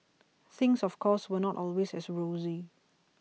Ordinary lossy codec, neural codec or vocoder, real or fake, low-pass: none; none; real; none